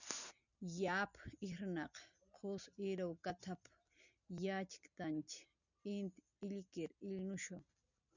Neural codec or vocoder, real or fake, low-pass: none; real; 7.2 kHz